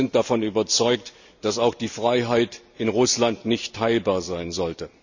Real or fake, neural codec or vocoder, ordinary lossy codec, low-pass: real; none; none; 7.2 kHz